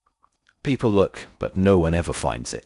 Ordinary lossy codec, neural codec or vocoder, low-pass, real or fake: none; codec, 16 kHz in and 24 kHz out, 0.6 kbps, FocalCodec, streaming, 4096 codes; 10.8 kHz; fake